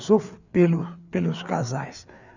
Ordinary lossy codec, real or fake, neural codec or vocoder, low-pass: none; fake; codec, 16 kHz, 4 kbps, FreqCodec, larger model; 7.2 kHz